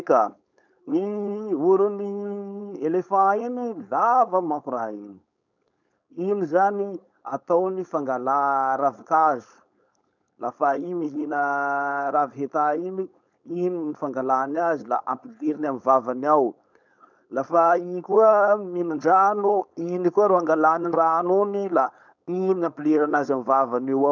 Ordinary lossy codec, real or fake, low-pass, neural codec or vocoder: none; fake; 7.2 kHz; codec, 16 kHz, 4.8 kbps, FACodec